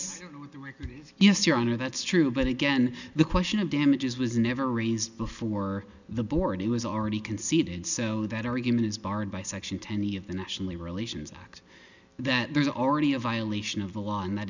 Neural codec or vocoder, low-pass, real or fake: none; 7.2 kHz; real